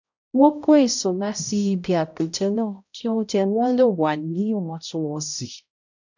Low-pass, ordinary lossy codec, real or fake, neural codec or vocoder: 7.2 kHz; none; fake; codec, 16 kHz, 0.5 kbps, X-Codec, HuBERT features, trained on balanced general audio